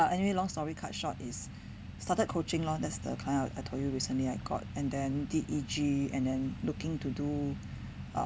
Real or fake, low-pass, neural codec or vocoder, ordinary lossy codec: real; none; none; none